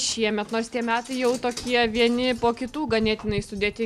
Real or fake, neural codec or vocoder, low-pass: real; none; 14.4 kHz